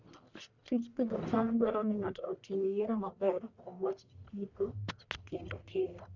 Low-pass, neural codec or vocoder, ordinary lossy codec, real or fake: 7.2 kHz; codec, 44.1 kHz, 1.7 kbps, Pupu-Codec; none; fake